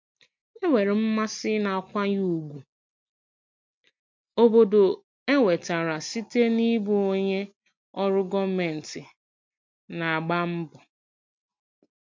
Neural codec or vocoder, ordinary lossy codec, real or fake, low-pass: none; MP3, 48 kbps; real; 7.2 kHz